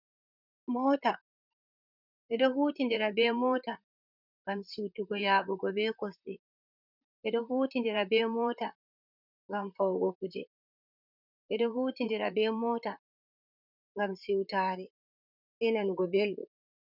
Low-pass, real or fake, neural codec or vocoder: 5.4 kHz; fake; vocoder, 44.1 kHz, 128 mel bands, Pupu-Vocoder